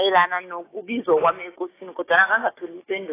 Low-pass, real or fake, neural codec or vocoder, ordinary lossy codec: 3.6 kHz; fake; codec, 16 kHz, 6 kbps, DAC; AAC, 16 kbps